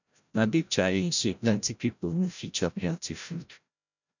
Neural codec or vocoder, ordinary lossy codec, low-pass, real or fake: codec, 16 kHz, 0.5 kbps, FreqCodec, larger model; none; 7.2 kHz; fake